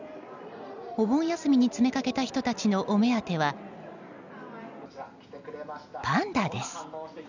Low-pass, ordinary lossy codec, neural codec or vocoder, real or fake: 7.2 kHz; none; none; real